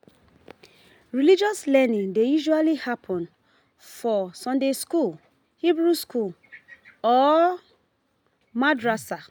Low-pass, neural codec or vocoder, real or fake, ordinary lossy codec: none; none; real; none